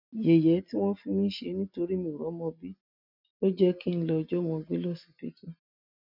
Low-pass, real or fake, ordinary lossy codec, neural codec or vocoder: 5.4 kHz; real; none; none